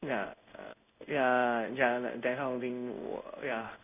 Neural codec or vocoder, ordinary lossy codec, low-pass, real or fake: codec, 16 kHz in and 24 kHz out, 1 kbps, XY-Tokenizer; none; 3.6 kHz; fake